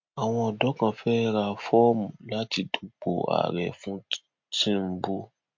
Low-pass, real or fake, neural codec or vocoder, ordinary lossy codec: 7.2 kHz; real; none; MP3, 48 kbps